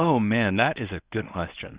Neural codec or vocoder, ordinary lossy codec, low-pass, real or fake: codec, 24 kHz, 0.9 kbps, WavTokenizer, medium speech release version 1; Opus, 64 kbps; 3.6 kHz; fake